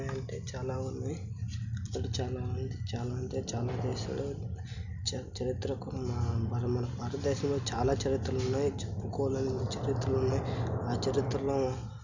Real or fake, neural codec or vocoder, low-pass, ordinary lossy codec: real; none; 7.2 kHz; none